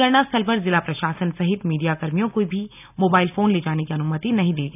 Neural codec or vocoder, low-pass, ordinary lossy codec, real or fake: none; 3.6 kHz; none; real